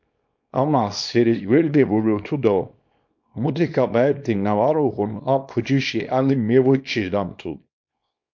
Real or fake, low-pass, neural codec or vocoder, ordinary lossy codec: fake; 7.2 kHz; codec, 24 kHz, 0.9 kbps, WavTokenizer, small release; MP3, 48 kbps